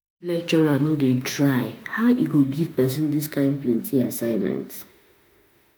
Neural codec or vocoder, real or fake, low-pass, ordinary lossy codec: autoencoder, 48 kHz, 32 numbers a frame, DAC-VAE, trained on Japanese speech; fake; none; none